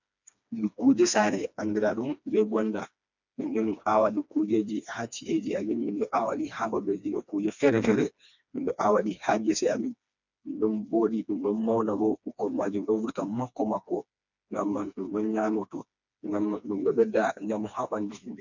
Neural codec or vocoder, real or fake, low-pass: codec, 16 kHz, 2 kbps, FreqCodec, smaller model; fake; 7.2 kHz